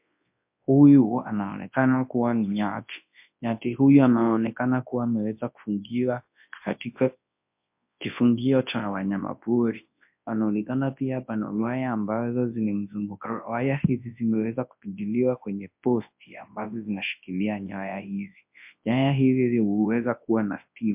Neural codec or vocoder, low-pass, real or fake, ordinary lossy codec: codec, 24 kHz, 0.9 kbps, WavTokenizer, large speech release; 3.6 kHz; fake; MP3, 32 kbps